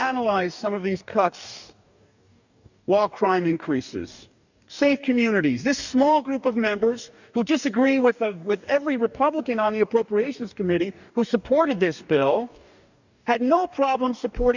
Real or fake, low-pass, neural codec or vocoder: fake; 7.2 kHz; codec, 44.1 kHz, 2.6 kbps, DAC